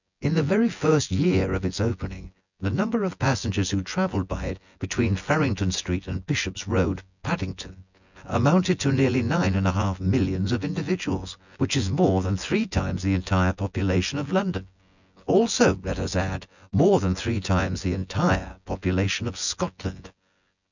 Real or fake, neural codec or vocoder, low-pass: fake; vocoder, 24 kHz, 100 mel bands, Vocos; 7.2 kHz